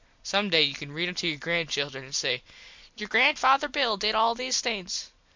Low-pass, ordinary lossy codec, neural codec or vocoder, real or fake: 7.2 kHz; MP3, 64 kbps; none; real